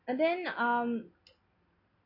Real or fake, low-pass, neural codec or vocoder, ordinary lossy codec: real; 5.4 kHz; none; AAC, 48 kbps